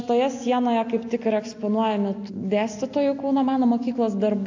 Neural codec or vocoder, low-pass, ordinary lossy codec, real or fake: none; 7.2 kHz; AAC, 48 kbps; real